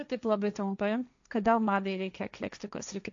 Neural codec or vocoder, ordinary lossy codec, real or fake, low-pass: codec, 16 kHz, 1.1 kbps, Voila-Tokenizer; AAC, 64 kbps; fake; 7.2 kHz